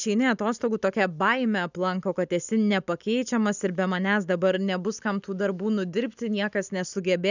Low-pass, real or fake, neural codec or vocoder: 7.2 kHz; real; none